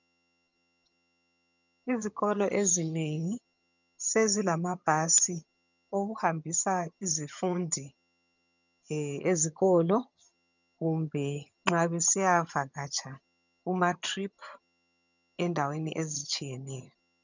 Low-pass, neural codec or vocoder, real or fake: 7.2 kHz; vocoder, 22.05 kHz, 80 mel bands, HiFi-GAN; fake